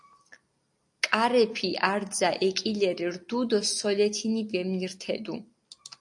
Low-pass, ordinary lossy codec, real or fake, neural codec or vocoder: 10.8 kHz; AAC, 64 kbps; real; none